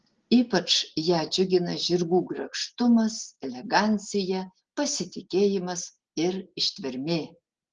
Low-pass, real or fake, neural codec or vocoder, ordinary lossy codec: 7.2 kHz; real; none; Opus, 16 kbps